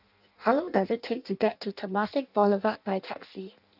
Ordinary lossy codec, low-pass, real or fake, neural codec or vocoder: none; 5.4 kHz; fake; codec, 16 kHz in and 24 kHz out, 0.6 kbps, FireRedTTS-2 codec